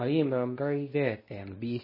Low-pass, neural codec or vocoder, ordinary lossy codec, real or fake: 5.4 kHz; codec, 24 kHz, 0.9 kbps, WavTokenizer, medium speech release version 2; MP3, 24 kbps; fake